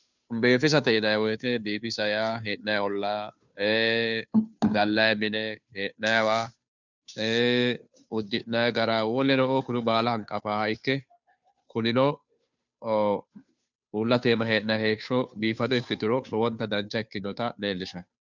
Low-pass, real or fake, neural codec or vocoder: 7.2 kHz; fake; codec, 16 kHz, 2 kbps, FunCodec, trained on Chinese and English, 25 frames a second